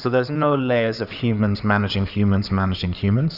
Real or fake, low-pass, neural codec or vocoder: fake; 5.4 kHz; codec, 16 kHz in and 24 kHz out, 2.2 kbps, FireRedTTS-2 codec